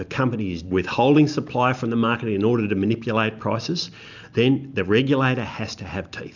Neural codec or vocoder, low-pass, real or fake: none; 7.2 kHz; real